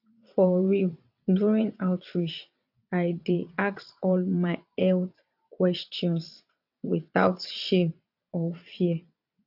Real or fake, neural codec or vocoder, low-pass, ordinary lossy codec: fake; vocoder, 22.05 kHz, 80 mel bands, WaveNeXt; 5.4 kHz; AAC, 48 kbps